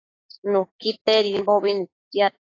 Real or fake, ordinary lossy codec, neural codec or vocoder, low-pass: fake; AAC, 32 kbps; codec, 16 kHz, 6 kbps, DAC; 7.2 kHz